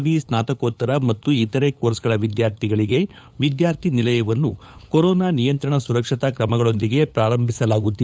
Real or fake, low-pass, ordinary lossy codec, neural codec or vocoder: fake; none; none; codec, 16 kHz, 8 kbps, FunCodec, trained on LibriTTS, 25 frames a second